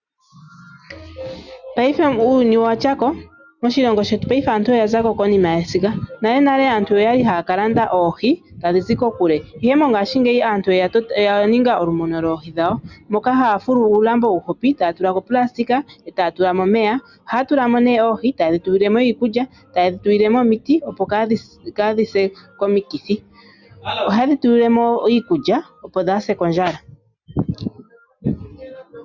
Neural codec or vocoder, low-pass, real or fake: none; 7.2 kHz; real